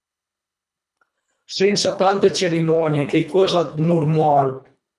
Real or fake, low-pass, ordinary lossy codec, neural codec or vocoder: fake; none; none; codec, 24 kHz, 1.5 kbps, HILCodec